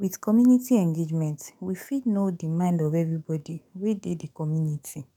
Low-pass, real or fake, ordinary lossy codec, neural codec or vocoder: 19.8 kHz; fake; none; codec, 44.1 kHz, 7.8 kbps, DAC